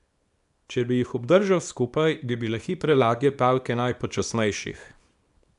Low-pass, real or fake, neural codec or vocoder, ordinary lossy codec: 10.8 kHz; fake; codec, 24 kHz, 0.9 kbps, WavTokenizer, small release; none